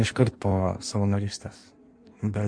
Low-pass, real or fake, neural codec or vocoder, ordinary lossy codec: 9.9 kHz; fake; codec, 16 kHz in and 24 kHz out, 1.1 kbps, FireRedTTS-2 codec; MP3, 64 kbps